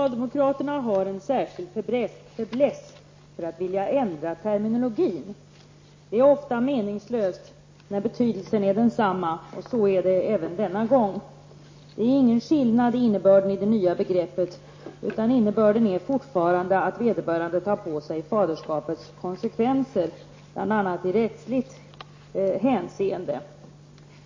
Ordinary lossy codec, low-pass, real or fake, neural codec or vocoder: MP3, 32 kbps; 7.2 kHz; real; none